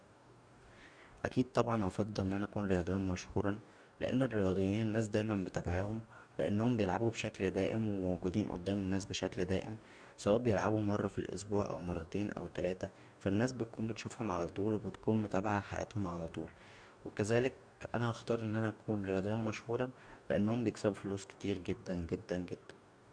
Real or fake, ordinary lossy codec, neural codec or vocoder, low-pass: fake; none; codec, 44.1 kHz, 2.6 kbps, DAC; 9.9 kHz